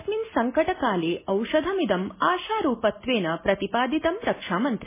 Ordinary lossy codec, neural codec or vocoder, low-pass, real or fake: MP3, 16 kbps; none; 3.6 kHz; real